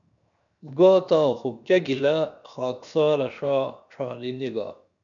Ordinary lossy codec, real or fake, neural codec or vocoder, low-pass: MP3, 96 kbps; fake; codec, 16 kHz, 0.7 kbps, FocalCodec; 7.2 kHz